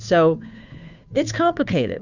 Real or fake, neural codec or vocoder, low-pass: fake; codec, 16 kHz, 2 kbps, FunCodec, trained on Chinese and English, 25 frames a second; 7.2 kHz